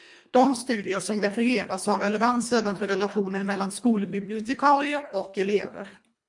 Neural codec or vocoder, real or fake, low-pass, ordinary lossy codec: codec, 24 kHz, 1.5 kbps, HILCodec; fake; 10.8 kHz; MP3, 64 kbps